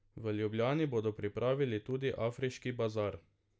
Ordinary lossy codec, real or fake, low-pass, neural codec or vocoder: none; real; none; none